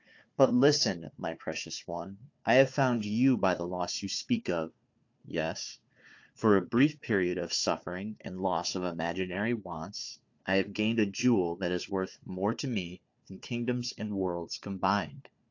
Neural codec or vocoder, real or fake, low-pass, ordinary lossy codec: codec, 16 kHz, 4 kbps, FunCodec, trained on Chinese and English, 50 frames a second; fake; 7.2 kHz; AAC, 48 kbps